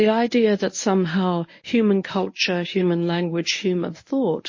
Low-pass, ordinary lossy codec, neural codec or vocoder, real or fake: 7.2 kHz; MP3, 32 kbps; codec, 24 kHz, 0.9 kbps, WavTokenizer, medium speech release version 1; fake